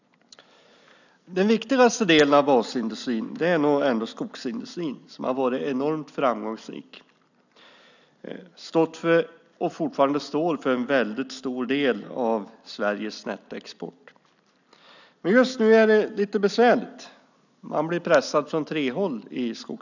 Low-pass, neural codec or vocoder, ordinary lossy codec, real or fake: 7.2 kHz; none; none; real